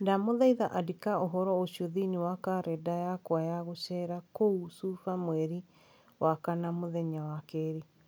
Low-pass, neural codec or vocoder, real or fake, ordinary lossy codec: none; none; real; none